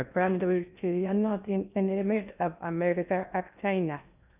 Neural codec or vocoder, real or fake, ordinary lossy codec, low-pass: codec, 16 kHz in and 24 kHz out, 0.6 kbps, FocalCodec, streaming, 4096 codes; fake; none; 3.6 kHz